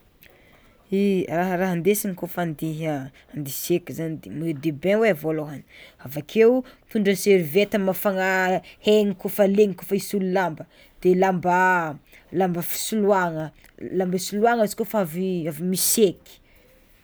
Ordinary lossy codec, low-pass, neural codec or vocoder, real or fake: none; none; none; real